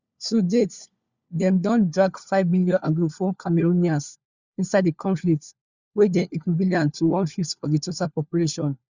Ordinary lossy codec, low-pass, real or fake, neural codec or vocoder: Opus, 64 kbps; 7.2 kHz; fake; codec, 16 kHz, 4 kbps, FunCodec, trained on LibriTTS, 50 frames a second